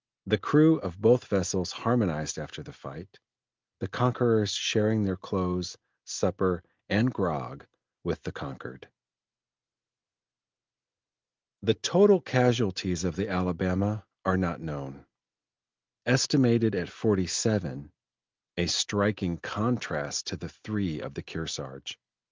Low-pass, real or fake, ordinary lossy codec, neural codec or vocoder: 7.2 kHz; real; Opus, 32 kbps; none